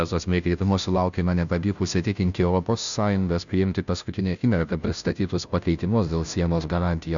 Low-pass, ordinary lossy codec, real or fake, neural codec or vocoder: 7.2 kHz; MP3, 96 kbps; fake; codec, 16 kHz, 0.5 kbps, FunCodec, trained on Chinese and English, 25 frames a second